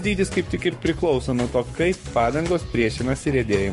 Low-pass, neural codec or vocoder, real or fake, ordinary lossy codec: 14.4 kHz; codec, 44.1 kHz, 7.8 kbps, Pupu-Codec; fake; MP3, 48 kbps